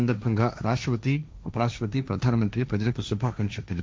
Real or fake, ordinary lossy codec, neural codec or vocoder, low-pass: fake; none; codec, 16 kHz, 1.1 kbps, Voila-Tokenizer; none